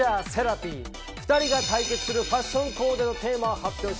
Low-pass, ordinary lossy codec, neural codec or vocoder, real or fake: none; none; none; real